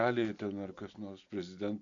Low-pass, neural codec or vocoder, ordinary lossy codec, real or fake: 7.2 kHz; none; AAC, 64 kbps; real